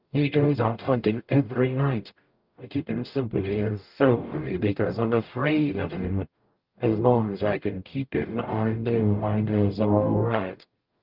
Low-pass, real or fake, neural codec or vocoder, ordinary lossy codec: 5.4 kHz; fake; codec, 44.1 kHz, 0.9 kbps, DAC; Opus, 24 kbps